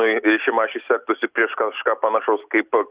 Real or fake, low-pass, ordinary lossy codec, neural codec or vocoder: real; 3.6 kHz; Opus, 64 kbps; none